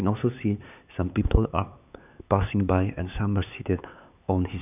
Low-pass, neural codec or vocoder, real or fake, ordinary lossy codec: 3.6 kHz; codec, 16 kHz, 4 kbps, X-Codec, HuBERT features, trained on LibriSpeech; fake; none